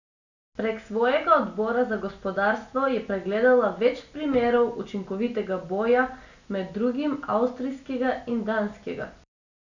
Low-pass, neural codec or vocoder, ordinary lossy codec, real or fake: 7.2 kHz; none; none; real